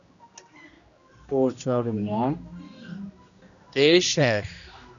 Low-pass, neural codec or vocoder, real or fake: 7.2 kHz; codec, 16 kHz, 1 kbps, X-Codec, HuBERT features, trained on general audio; fake